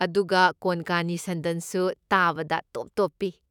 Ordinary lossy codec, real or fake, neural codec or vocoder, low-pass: none; fake; autoencoder, 48 kHz, 128 numbers a frame, DAC-VAE, trained on Japanese speech; 19.8 kHz